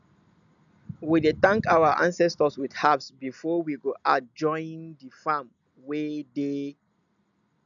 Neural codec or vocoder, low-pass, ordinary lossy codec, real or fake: none; 7.2 kHz; none; real